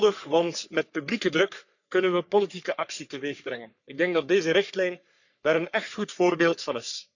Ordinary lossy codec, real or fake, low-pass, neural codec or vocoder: none; fake; 7.2 kHz; codec, 44.1 kHz, 3.4 kbps, Pupu-Codec